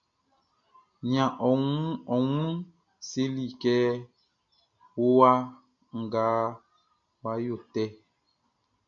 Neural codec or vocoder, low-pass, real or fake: none; 7.2 kHz; real